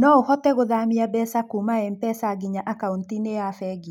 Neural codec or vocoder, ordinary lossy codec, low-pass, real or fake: none; none; 19.8 kHz; real